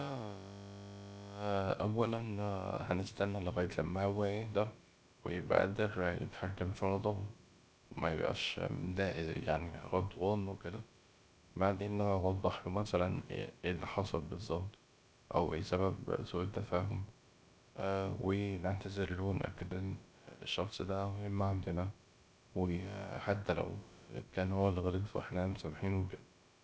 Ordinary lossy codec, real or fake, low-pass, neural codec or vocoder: none; fake; none; codec, 16 kHz, about 1 kbps, DyCAST, with the encoder's durations